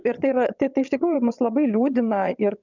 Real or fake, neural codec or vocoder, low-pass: fake; vocoder, 22.05 kHz, 80 mel bands, WaveNeXt; 7.2 kHz